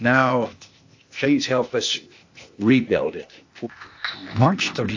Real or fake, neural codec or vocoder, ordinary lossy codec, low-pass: fake; codec, 16 kHz, 0.8 kbps, ZipCodec; AAC, 48 kbps; 7.2 kHz